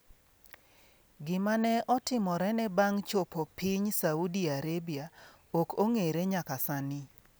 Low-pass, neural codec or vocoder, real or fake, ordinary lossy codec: none; none; real; none